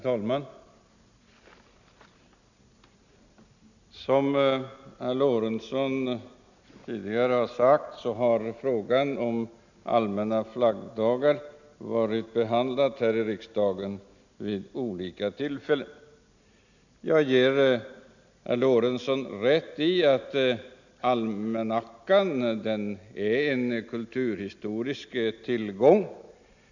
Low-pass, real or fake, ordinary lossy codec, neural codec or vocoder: 7.2 kHz; real; none; none